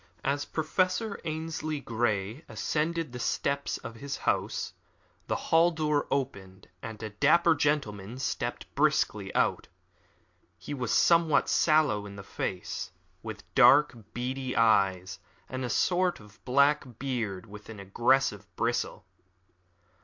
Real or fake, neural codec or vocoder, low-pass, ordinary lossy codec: real; none; 7.2 kHz; MP3, 48 kbps